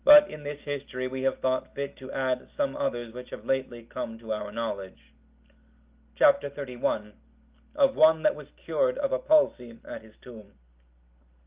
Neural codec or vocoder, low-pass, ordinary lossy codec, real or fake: none; 3.6 kHz; Opus, 24 kbps; real